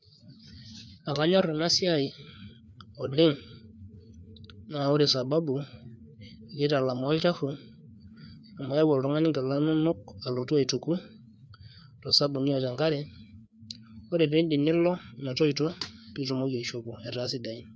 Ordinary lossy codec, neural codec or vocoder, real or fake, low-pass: none; codec, 16 kHz, 4 kbps, FreqCodec, larger model; fake; none